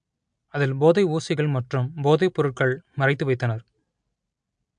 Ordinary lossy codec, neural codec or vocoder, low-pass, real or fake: MP3, 64 kbps; none; 9.9 kHz; real